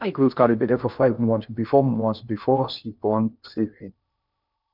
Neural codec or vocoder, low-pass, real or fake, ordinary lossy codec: codec, 16 kHz in and 24 kHz out, 0.6 kbps, FocalCodec, streaming, 2048 codes; 5.4 kHz; fake; none